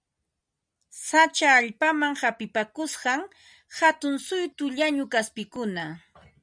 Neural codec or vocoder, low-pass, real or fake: none; 9.9 kHz; real